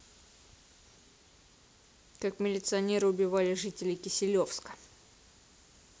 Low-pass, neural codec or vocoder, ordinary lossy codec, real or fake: none; none; none; real